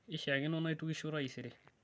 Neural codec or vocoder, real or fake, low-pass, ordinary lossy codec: none; real; none; none